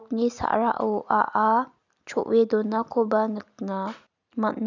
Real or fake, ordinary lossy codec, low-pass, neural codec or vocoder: real; none; 7.2 kHz; none